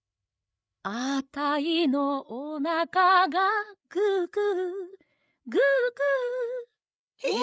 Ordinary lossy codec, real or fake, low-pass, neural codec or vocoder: none; fake; none; codec, 16 kHz, 8 kbps, FreqCodec, larger model